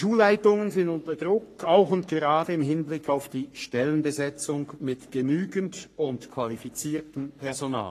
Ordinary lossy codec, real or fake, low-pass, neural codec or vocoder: AAC, 48 kbps; fake; 14.4 kHz; codec, 44.1 kHz, 3.4 kbps, Pupu-Codec